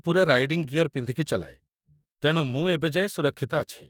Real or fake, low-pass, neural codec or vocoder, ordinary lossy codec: fake; 19.8 kHz; codec, 44.1 kHz, 2.6 kbps, DAC; none